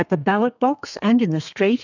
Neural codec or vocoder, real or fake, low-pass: codec, 32 kHz, 1.9 kbps, SNAC; fake; 7.2 kHz